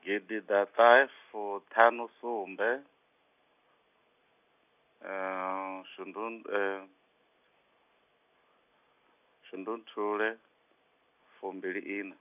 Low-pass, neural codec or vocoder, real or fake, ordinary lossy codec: 3.6 kHz; none; real; none